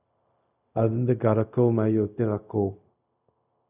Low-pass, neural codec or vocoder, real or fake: 3.6 kHz; codec, 16 kHz, 0.4 kbps, LongCat-Audio-Codec; fake